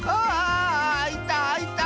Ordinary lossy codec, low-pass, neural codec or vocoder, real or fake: none; none; none; real